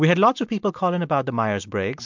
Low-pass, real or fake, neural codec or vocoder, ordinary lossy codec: 7.2 kHz; real; none; MP3, 64 kbps